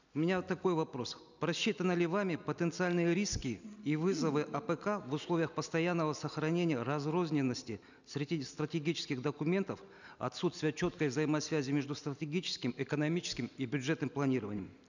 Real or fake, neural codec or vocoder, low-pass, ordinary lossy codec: real; none; 7.2 kHz; none